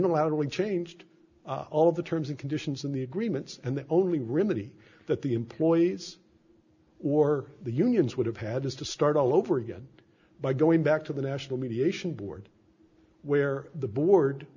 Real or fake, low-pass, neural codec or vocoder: real; 7.2 kHz; none